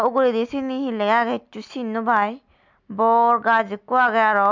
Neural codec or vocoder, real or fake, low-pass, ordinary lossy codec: none; real; 7.2 kHz; MP3, 64 kbps